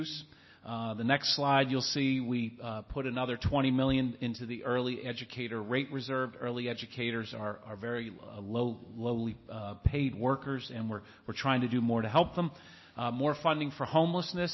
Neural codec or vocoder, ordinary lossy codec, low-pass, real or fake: none; MP3, 24 kbps; 7.2 kHz; real